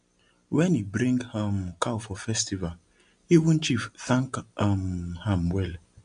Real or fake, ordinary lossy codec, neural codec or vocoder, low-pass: real; AAC, 64 kbps; none; 9.9 kHz